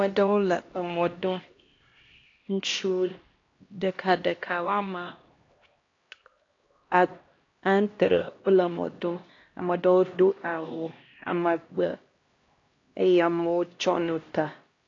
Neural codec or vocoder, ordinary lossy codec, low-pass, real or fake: codec, 16 kHz, 1 kbps, X-Codec, HuBERT features, trained on LibriSpeech; AAC, 32 kbps; 7.2 kHz; fake